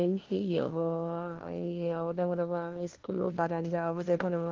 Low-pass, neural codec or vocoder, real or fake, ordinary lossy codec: 7.2 kHz; codec, 16 kHz, 1 kbps, FunCodec, trained on LibriTTS, 50 frames a second; fake; Opus, 16 kbps